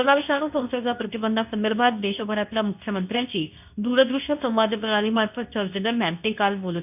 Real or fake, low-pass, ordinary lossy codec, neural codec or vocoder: fake; 3.6 kHz; none; codec, 24 kHz, 0.9 kbps, WavTokenizer, medium speech release version 2